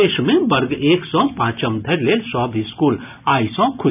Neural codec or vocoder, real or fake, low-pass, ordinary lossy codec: none; real; 3.6 kHz; none